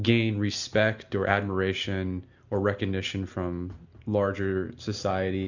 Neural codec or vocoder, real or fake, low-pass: none; real; 7.2 kHz